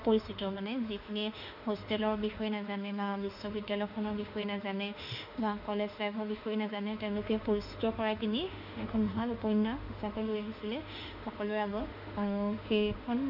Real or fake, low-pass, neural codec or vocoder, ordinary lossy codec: fake; 5.4 kHz; autoencoder, 48 kHz, 32 numbers a frame, DAC-VAE, trained on Japanese speech; none